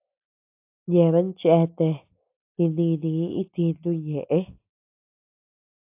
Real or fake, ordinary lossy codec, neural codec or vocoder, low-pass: real; AAC, 32 kbps; none; 3.6 kHz